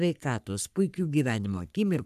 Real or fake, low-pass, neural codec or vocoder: fake; 14.4 kHz; codec, 44.1 kHz, 3.4 kbps, Pupu-Codec